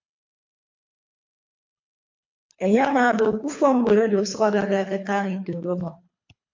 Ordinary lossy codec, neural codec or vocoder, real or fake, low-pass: MP3, 48 kbps; codec, 24 kHz, 3 kbps, HILCodec; fake; 7.2 kHz